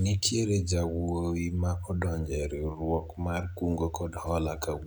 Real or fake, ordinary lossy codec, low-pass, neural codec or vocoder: real; none; none; none